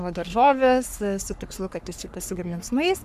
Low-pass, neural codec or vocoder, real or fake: 14.4 kHz; codec, 44.1 kHz, 3.4 kbps, Pupu-Codec; fake